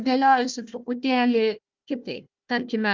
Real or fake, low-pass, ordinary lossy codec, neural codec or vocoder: fake; 7.2 kHz; Opus, 24 kbps; codec, 16 kHz, 1 kbps, FunCodec, trained on Chinese and English, 50 frames a second